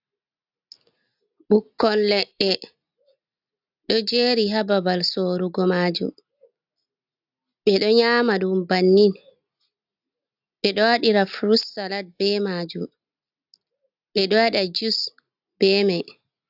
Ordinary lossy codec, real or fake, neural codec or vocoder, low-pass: AAC, 48 kbps; real; none; 5.4 kHz